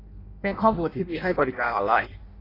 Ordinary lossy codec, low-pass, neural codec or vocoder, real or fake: AAC, 24 kbps; 5.4 kHz; codec, 16 kHz in and 24 kHz out, 0.6 kbps, FireRedTTS-2 codec; fake